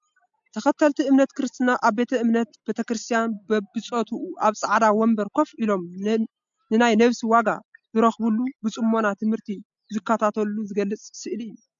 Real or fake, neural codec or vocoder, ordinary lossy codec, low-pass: real; none; MP3, 64 kbps; 7.2 kHz